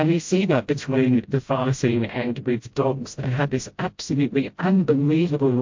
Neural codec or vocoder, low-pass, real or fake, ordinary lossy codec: codec, 16 kHz, 0.5 kbps, FreqCodec, smaller model; 7.2 kHz; fake; MP3, 48 kbps